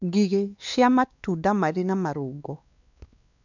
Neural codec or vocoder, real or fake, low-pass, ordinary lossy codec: codec, 16 kHz, 2 kbps, X-Codec, WavLM features, trained on Multilingual LibriSpeech; fake; 7.2 kHz; none